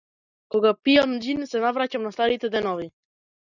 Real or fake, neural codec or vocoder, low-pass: real; none; 7.2 kHz